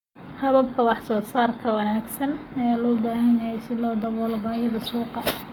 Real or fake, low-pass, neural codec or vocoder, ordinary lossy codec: fake; 19.8 kHz; codec, 44.1 kHz, 7.8 kbps, Pupu-Codec; none